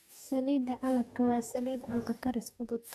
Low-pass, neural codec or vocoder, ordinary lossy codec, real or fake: 14.4 kHz; codec, 44.1 kHz, 2.6 kbps, DAC; none; fake